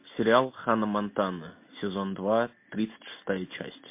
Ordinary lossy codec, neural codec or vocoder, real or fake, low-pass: MP3, 24 kbps; none; real; 3.6 kHz